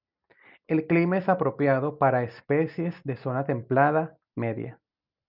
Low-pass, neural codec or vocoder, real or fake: 5.4 kHz; none; real